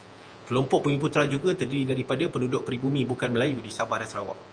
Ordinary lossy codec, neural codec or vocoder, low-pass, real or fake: Opus, 32 kbps; vocoder, 48 kHz, 128 mel bands, Vocos; 9.9 kHz; fake